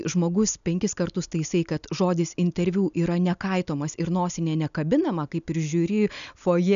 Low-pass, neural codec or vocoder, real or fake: 7.2 kHz; none; real